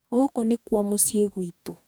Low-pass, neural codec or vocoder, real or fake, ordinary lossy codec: none; codec, 44.1 kHz, 2.6 kbps, DAC; fake; none